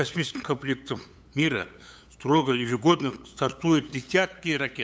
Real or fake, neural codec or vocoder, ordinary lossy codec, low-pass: fake; codec, 16 kHz, 8 kbps, FunCodec, trained on LibriTTS, 25 frames a second; none; none